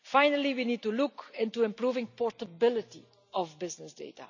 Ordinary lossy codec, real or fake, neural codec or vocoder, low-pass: none; real; none; 7.2 kHz